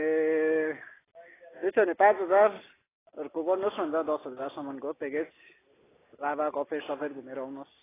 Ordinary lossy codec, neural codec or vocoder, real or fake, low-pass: AAC, 16 kbps; none; real; 3.6 kHz